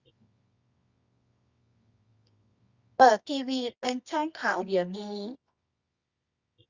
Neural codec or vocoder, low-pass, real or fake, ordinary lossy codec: codec, 24 kHz, 0.9 kbps, WavTokenizer, medium music audio release; 7.2 kHz; fake; Opus, 64 kbps